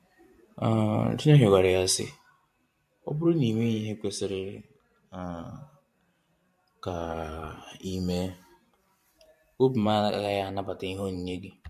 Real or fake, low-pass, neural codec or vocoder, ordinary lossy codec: fake; 14.4 kHz; vocoder, 44.1 kHz, 128 mel bands every 512 samples, BigVGAN v2; MP3, 64 kbps